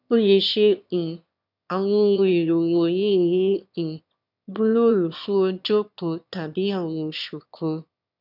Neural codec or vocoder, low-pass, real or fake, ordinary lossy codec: autoencoder, 22.05 kHz, a latent of 192 numbers a frame, VITS, trained on one speaker; 5.4 kHz; fake; none